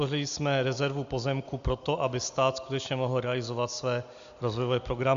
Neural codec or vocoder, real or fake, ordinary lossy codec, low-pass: none; real; Opus, 64 kbps; 7.2 kHz